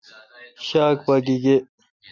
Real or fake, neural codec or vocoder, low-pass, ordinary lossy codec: real; none; 7.2 kHz; MP3, 64 kbps